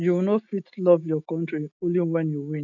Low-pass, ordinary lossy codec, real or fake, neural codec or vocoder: 7.2 kHz; none; real; none